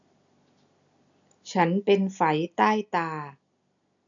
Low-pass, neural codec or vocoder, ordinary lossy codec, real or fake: 7.2 kHz; none; none; real